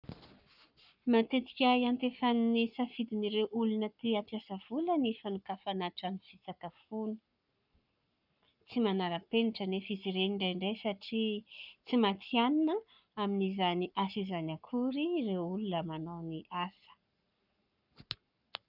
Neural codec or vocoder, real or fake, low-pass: codec, 44.1 kHz, 7.8 kbps, Pupu-Codec; fake; 5.4 kHz